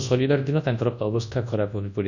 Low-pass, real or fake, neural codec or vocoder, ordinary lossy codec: 7.2 kHz; fake; codec, 24 kHz, 0.9 kbps, WavTokenizer, large speech release; none